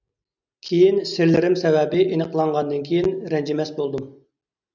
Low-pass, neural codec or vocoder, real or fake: 7.2 kHz; none; real